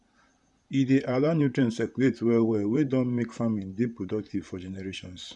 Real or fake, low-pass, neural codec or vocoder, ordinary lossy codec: fake; 10.8 kHz; vocoder, 44.1 kHz, 128 mel bands, Pupu-Vocoder; none